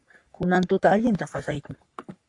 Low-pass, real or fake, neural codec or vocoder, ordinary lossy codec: 10.8 kHz; fake; codec, 44.1 kHz, 3.4 kbps, Pupu-Codec; AAC, 64 kbps